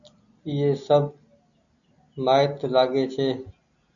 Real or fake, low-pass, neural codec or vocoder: real; 7.2 kHz; none